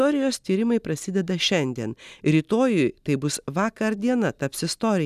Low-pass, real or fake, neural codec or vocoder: 14.4 kHz; real; none